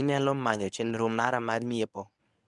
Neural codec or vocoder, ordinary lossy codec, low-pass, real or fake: codec, 24 kHz, 0.9 kbps, WavTokenizer, medium speech release version 1; none; 10.8 kHz; fake